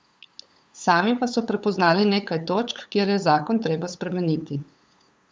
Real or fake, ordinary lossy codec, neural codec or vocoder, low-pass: fake; none; codec, 16 kHz, 8 kbps, FunCodec, trained on LibriTTS, 25 frames a second; none